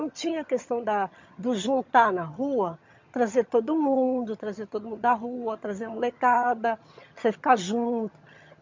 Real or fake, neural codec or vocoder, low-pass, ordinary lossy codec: fake; vocoder, 22.05 kHz, 80 mel bands, HiFi-GAN; 7.2 kHz; MP3, 48 kbps